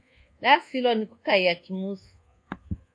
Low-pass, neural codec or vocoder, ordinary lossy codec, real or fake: 9.9 kHz; codec, 24 kHz, 1.2 kbps, DualCodec; AAC, 48 kbps; fake